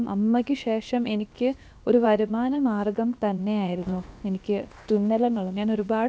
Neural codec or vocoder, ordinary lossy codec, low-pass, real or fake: codec, 16 kHz, about 1 kbps, DyCAST, with the encoder's durations; none; none; fake